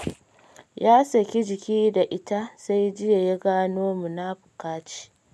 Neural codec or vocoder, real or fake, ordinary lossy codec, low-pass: none; real; none; none